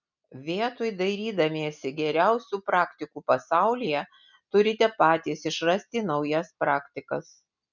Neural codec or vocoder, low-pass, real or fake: none; 7.2 kHz; real